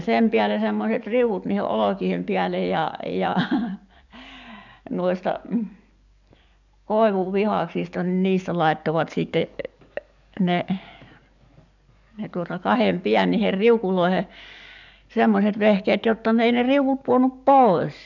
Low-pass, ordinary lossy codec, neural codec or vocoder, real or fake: 7.2 kHz; none; codec, 44.1 kHz, 7.8 kbps, DAC; fake